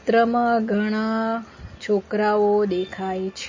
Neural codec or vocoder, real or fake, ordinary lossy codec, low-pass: none; real; MP3, 32 kbps; 7.2 kHz